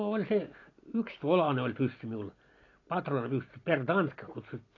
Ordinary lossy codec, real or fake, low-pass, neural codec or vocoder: AAC, 32 kbps; real; 7.2 kHz; none